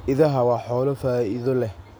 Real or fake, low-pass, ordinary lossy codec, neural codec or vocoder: real; none; none; none